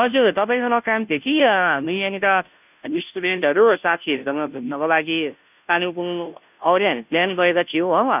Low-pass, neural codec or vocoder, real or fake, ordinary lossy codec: 3.6 kHz; codec, 16 kHz, 0.5 kbps, FunCodec, trained on Chinese and English, 25 frames a second; fake; none